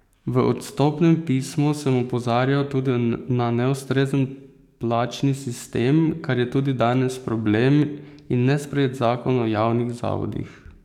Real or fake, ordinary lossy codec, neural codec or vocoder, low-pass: fake; none; codec, 44.1 kHz, 7.8 kbps, DAC; 19.8 kHz